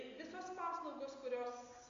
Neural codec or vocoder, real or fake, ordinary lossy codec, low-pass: none; real; MP3, 48 kbps; 7.2 kHz